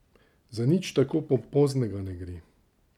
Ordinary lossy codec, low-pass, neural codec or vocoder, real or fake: none; 19.8 kHz; none; real